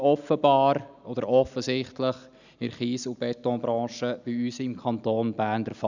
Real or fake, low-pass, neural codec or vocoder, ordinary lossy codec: real; 7.2 kHz; none; none